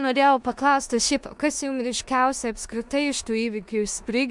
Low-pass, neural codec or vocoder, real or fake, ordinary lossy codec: 10.8 kHz; codec, 16 kHz in and 24 kHz out, 0.9 kbps, LongCat-Audio-Codec, four codebook decoder; fake; MP3, 96 kbps